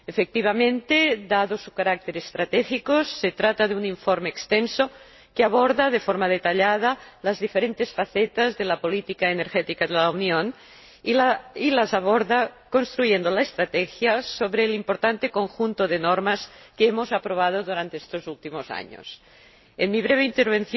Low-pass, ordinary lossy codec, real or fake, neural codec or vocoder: 7.2 kHz; MP3, 24 kbps; real; none